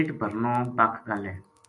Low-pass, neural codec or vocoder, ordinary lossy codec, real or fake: 10.8 kHz; none; AAC, 64 kbps; real